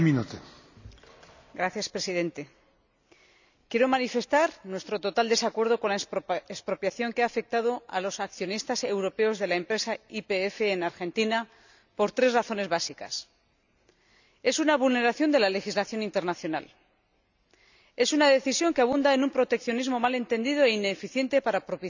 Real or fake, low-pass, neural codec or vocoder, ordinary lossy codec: real; 7.2 kHz; none; none